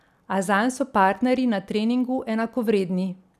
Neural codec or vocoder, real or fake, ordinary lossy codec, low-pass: none; real; none; 14.4 kHz